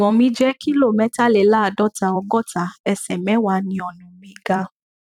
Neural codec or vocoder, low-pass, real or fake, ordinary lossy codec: vocoder, 44.1 kHz, 128 mel bands every 256 samples, BigVGAN v2; 19.8 kHz; fake; none